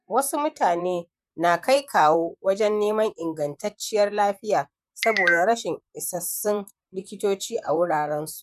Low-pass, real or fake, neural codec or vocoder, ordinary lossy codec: 14.4 kHz; fake; vocoder, 44.1 kHz, 128 mel bands, Pupu-Vocoder; none